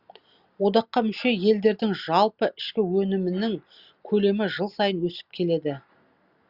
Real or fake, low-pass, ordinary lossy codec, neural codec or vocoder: real; 5.4 kHz; Opus, 64 kbps; none